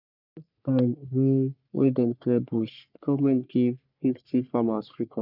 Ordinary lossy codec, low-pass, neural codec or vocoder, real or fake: none; 5.4 kHz; codec, 44.1 kHz, 3.4 kbps, Pupu-Codec; fake